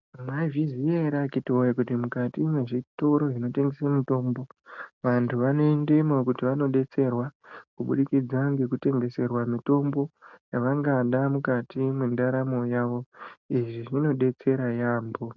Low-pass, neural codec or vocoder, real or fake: 7.2 kHz; none; real